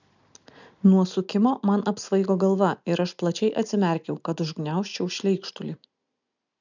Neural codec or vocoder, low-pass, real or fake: none; 7.2 kHz; real